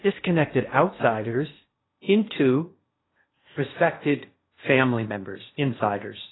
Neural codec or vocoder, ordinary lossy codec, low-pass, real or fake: codec, 16 kHz in and 24 kHz out, 0.6 kbps, FocalCodec, streaming, 2048 codes; AAC, 16 kbps; 7.2 kHz; fake